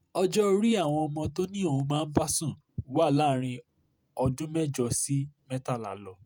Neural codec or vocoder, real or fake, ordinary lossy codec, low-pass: vocoder, 48 kHz, 128 mel bands, Vocos; fake; none; none